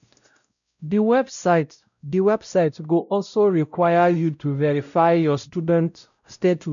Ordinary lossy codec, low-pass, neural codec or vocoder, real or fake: none; 7.2 kHz; codec, 16 kHz, 0.5 kbps, X-Codec, WavLM features, trained on Multilingual LibriSpeech; fake